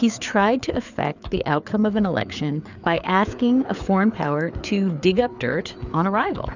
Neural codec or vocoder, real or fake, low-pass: codec, 16 kHz, 4 kbps, FreqCodec, larger model; fake; 7.2 kHz